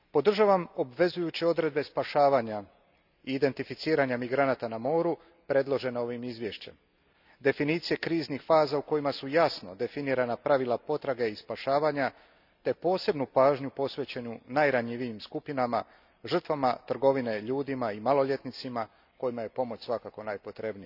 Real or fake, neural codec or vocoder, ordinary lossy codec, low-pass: real; none; none; 5.4 kHz